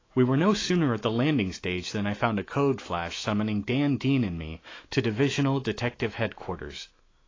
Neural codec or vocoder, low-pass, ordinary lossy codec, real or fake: autoencoder, 48 kHz, 128 numbers a frame, DAC-VAE, trained on Japanese speech; 7.2 kHz; AAC, 32 kbps; fake